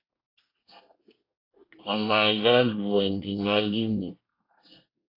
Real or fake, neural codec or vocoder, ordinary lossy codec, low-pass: fake; codec, 24 kHz, 1 kbps, SNAC; AAC, 32 kbps; 5.4 kHz